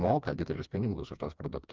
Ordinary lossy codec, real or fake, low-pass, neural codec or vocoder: Opus, 24 kbps; fake; 7.2 kHz; codec, 16 kHz, 2 kbps, FreqCodec, smaller model